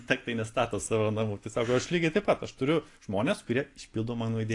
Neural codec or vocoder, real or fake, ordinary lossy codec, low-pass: none; real; AAC, 48 kbps; 10.8 kHz